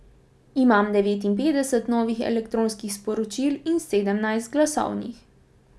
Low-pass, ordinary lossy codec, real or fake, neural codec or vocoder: none; none; real; none